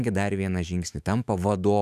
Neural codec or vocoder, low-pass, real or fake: autoencoder, 48 kHz, 128 numbers a frame, DAC-VAE, trained on Japanese speech; 14.4 kHz; fake